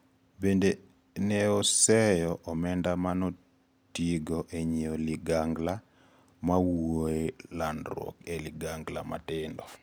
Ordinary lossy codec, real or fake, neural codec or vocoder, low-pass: none; real; none; none